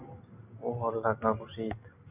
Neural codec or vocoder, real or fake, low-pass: none; real; 3.6 kHz